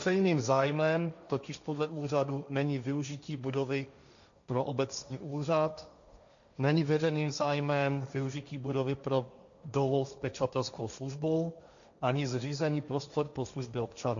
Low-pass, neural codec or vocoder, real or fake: 7.2 kHz; codec, 16 kHz, 1.1 kbps, Voila-Tokenizer; fake